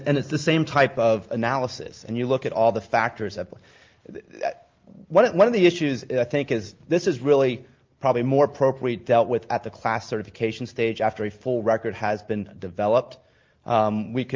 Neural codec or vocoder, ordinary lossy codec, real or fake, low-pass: none; Opus, 32 kbps; real; 7.2 kHz